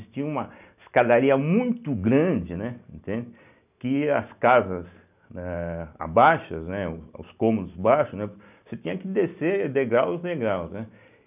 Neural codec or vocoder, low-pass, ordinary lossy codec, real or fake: none; 3.6 kHz; none; real